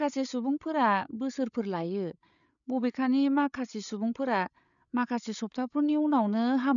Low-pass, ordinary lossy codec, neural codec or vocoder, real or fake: 7.2 kHz; none; codec, 16 kHz, 8 kbps, FreqCodec, larger model; fake